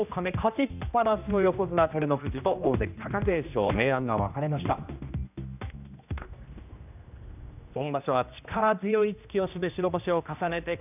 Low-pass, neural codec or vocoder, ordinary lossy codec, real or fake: 3.6 kHz; codec, 16 kHz, 1 kbps, X-Codec, HuBERT features, trained on general audio; none; fake